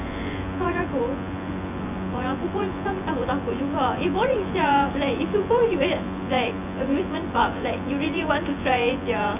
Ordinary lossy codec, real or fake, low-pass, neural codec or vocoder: none; fake; 3.6 kHz; vocoder, 24 kHz, 100 mel bands, Vocos